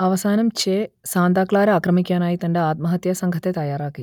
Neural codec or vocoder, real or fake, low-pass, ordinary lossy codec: none; real; 19.8 kHz; none